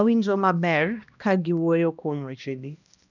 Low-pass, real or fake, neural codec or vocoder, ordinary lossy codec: 7.2 kHz; fake; codec, 16 kHz, 1 kbps, X-Codec, HuBERT features, trained on balanced general audio; none